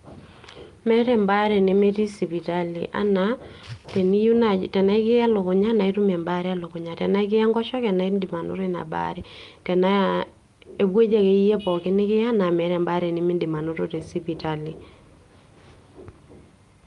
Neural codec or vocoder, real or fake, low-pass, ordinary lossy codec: none; real; 10.8 kHz; Opus, 32 kbps